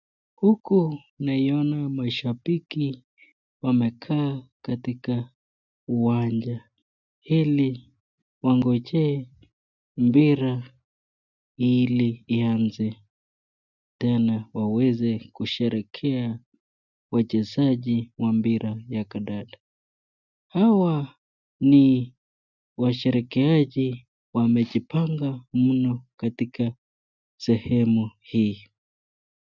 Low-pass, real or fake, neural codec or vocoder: 7.2 kHz; real; none